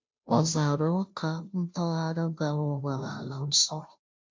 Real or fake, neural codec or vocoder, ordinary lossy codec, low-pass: fake; codec, 16 kHz, 0.5 kbps, FunCodec, trained on Chinese and English, 25 frames a second; MP3, 48 kbps; 7.2 kHz